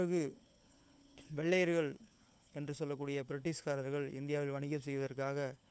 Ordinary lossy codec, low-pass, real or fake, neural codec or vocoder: none; none; fake; codec, 16 kHz, 4 kbps, FunCodec, trained on LibriTTS, 50 frames a second